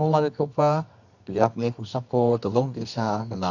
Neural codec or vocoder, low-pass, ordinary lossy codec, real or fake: codec, 24 kHz, 0.9 kbps, WavTokenizer, medium music audio release; 7.2 kHz; none; fake